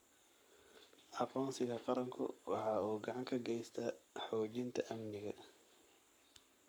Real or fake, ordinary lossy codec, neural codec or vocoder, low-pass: fake; none; codec, 44.1 kHz, 7.8 kbps, Pupu-Codec; none